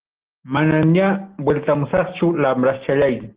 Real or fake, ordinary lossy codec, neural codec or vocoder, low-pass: real; Opus, 16 kbps; none; 3.6 kHz